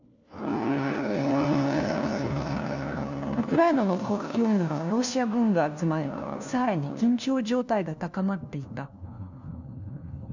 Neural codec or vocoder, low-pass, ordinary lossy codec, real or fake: codec, 16 kHz, 1 kbps, FunCodec, trained on LibriTTS, 50 frames a second; 7.2 kHz; none; fake